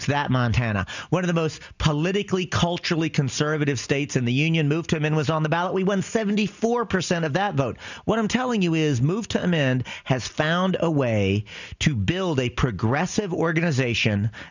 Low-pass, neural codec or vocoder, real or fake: 7.2 kHz; none; real